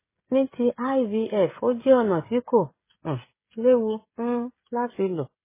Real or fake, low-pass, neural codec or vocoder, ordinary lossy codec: fake; 3.6 kHz; codec, 16 kHz, 16 kbps, FreqCodec, smaller model; MP3, 16 kbps